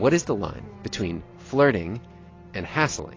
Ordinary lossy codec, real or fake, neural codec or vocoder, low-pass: AAC, 32 kbps; real; none; 7.2 kHz